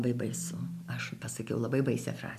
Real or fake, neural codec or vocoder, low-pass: real; none; 14.4 kHz